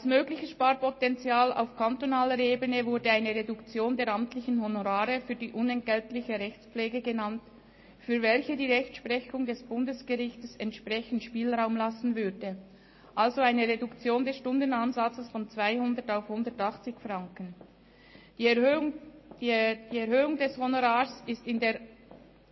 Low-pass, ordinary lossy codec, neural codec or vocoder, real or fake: 7.2 kHz; MP3, 24 kbps; none; real